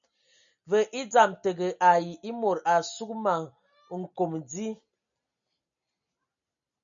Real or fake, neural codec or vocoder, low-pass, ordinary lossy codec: real; none; 7.2 kHz; MP3, 64 kbps